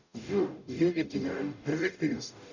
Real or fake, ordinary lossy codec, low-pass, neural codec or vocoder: fake; none; 7.2 kHz; codec, 44.1 kHz, 0.9 kbps, DAC